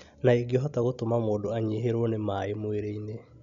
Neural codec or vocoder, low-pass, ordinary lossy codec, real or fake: none; 7.2 kHz; none; real